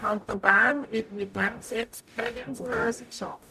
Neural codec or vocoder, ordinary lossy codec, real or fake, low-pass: codec, 44.1 kHz, 0.9 kbps, DAC; none; fake; 14.4 kHz